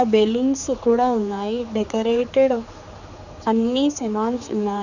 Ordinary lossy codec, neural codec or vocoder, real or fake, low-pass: none; codec, 16 kHz, 4 kbps, X-Codec, HuBERT features, trained on general audio; fake; 7.2 kHz